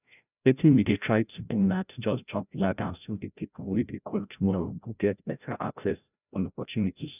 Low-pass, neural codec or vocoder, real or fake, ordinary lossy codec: 3.6 kHz; codec, 16 kHz, 0.5 kbps, FreqCodec, larger model; fake; none